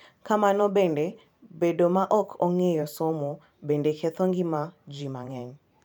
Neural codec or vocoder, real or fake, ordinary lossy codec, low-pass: vocoder, 44.1 kHz, 128 mel bands every 512 samples, BigVGAN v2; fake; none; 19.8 kHz